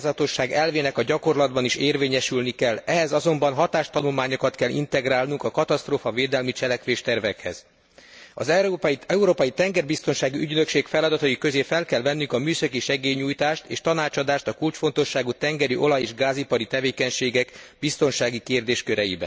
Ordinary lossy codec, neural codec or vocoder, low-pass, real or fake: none; none; none; real